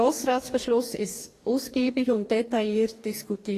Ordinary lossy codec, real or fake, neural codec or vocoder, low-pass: AAC, 48 kbps; fake; codec, 44.1 kHz, 2.6 kbps, DAC; 14.4 kHz